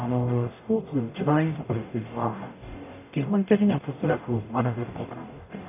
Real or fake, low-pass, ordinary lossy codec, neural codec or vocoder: fake; 3.6 kHz; none; codec, 44.1 kHz, 0.9 kbps, DAC